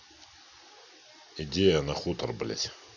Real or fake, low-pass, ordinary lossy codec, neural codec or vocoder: real; 7.2 kHz; none; none